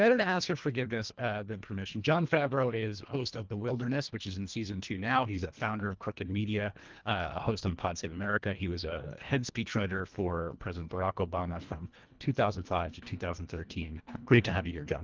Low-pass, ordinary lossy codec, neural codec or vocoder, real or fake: 7.2 kHz; Opus, 32 kbps; codec, 24 kHz, 1.5 kbps, HILCodec; fake